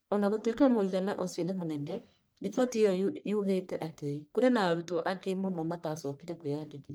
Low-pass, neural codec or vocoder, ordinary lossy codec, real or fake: none; codec, 44.1 kHz, 1.7 kbps, Pupu-Codec; none; fake